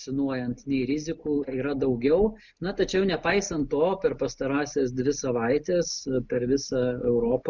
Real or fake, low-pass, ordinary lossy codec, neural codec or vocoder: real; 7.2 kHz; Opus, 64 kbps; none